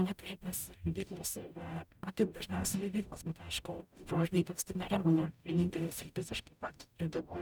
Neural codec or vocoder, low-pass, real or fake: codec, 44.1 kHz, 0.9 kbps, DAC; 19.8 kHz; fake